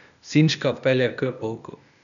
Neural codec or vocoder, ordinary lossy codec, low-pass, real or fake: codec, 16 kHz, 0.8 kbps, ZipCodec; none; 7.2 kHz; fake